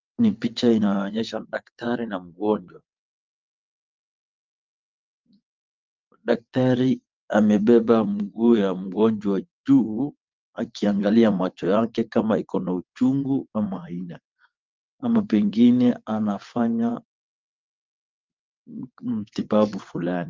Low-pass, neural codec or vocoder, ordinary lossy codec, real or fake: 7.2 kHz; vocoder, 22.05 kHz, 80 mel bands, WaveNeXt; Opus, 24 kbps; fake